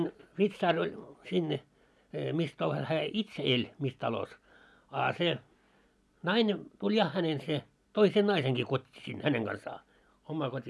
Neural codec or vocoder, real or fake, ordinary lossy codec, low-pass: none; real; none; none